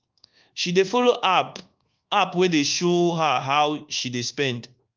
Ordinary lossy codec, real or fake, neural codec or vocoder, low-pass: Opus, 32 kbps; fake; codec, 24 kHz, 1.2 kbps, DualCodec; 7.2 kHz